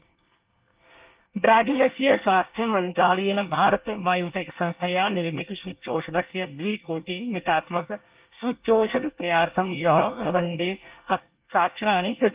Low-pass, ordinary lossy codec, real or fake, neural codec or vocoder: 3.6 kHz; Opus, 32 kbps; fake; codec, 24 kHz, 1 kbps, SNAC